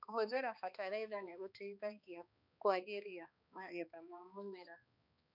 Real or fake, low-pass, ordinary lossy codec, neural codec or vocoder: fake; 5.4 kHz; none; codec, 16 kHz, 2 kbps, X-Codec, HuBERT features, trained on balanced general audio